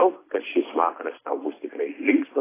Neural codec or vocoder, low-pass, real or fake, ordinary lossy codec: none; 3.6 kHz; real; AAC, 16 kbps